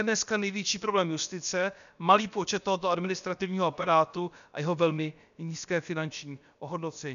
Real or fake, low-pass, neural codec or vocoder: fake; 7.2 kHz; codec, 16 kHz, about 1 kbps, DyCAST, with the encoder's durations